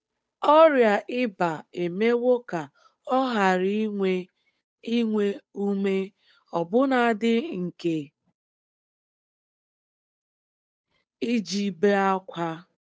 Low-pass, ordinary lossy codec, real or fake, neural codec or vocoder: none; none; fake; codec, 16 kHz, 8 kbps, FunCodec, trained on Chinese and English, 25 frames a second